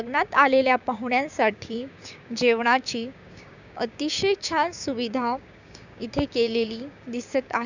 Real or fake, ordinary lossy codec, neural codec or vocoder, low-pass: fake; none; codec, 16 kHz, 6 kbps, DAC; 7.2 kHz